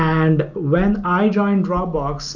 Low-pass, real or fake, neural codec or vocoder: 7.2 kHz; real; none